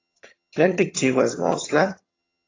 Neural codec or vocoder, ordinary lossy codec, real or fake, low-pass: vocoder, 22.05 kHz, 80 mel bands, HiFi-GAN; AAC, 32 kbps; fake; 7.2 kHz